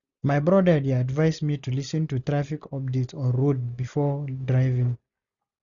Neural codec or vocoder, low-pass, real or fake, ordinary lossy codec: none; 7.2 kHz; real; none